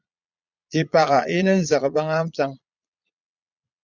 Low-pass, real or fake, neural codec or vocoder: 7.2 kHz; fake; vocoder, 22.05 kHz, 80 mel bands, Vocos